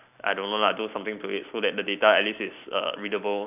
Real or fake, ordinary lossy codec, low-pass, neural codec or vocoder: fake; none; 3.6 kHz; vocoder, 44.1 kHz, 128 mel bands every 256 samples, BigVGAN v2